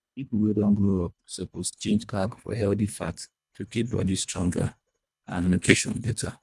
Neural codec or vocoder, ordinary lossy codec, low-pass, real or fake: codec, 24 kHz, 1.5 kbps, HILCodec; none; none; fake